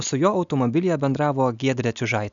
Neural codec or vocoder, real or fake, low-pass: none; real; 7.2 kHz